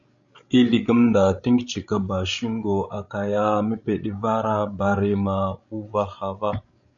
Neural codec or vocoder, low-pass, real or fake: codec, 16 kHz, 16 kbps, FreqCodec, larger model; 7.2 kHz; fake